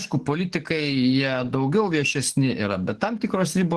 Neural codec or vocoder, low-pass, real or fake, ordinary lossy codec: codec, 44.1 kHz, 7.8 kbps, DAC; 10.8 kHz; fake; Opus, 16 kbps